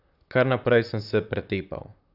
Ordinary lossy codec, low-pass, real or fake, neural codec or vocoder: none; 5.4 kHz; fake; vocoder, 44.1 kHz, 128 mel bands, Pupu-Vocoder